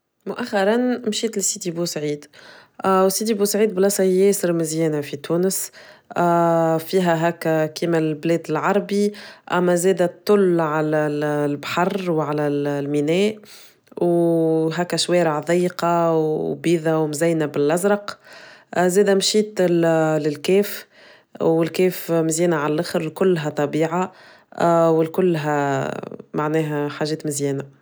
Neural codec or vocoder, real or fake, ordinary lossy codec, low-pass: none; real; none; none